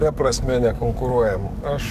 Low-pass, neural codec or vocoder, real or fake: 14.4 kHz; codec, 44.1 kHz, 7.8 kbps, DAC; fake